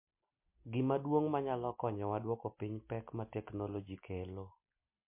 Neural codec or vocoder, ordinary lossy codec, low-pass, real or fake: none; MP3, 24 kbps; 3.6 kHz; real